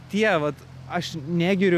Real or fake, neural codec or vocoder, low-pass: real; none; 14.4 kHz